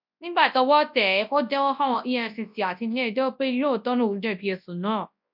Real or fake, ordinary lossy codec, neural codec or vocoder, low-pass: fake; MP3, 48 kbps; codec, 24 kHz, 0.9 kbps, WavTokenizer, large speech release; 5.4 kHz